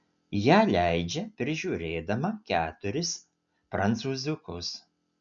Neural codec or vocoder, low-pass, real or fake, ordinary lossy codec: none; 7.2 kHz; real; MP3, 96 kbps